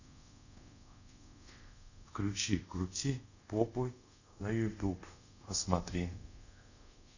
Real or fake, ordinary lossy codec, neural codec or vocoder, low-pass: fake; none; codec, 24 kHz, 0.5 kbps, DualCodec; 7.2 kHz